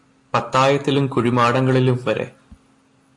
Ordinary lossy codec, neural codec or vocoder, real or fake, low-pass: AAC, 48 kbps; none; real; 10.8 kHz